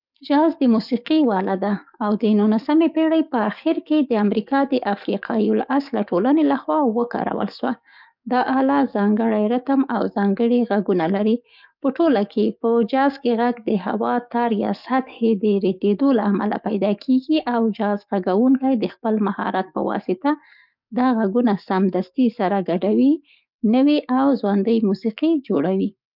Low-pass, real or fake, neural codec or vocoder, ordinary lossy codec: 5.4 kHz; fake; codec, 44.1 kHz, 7.8 kbps, DAC; none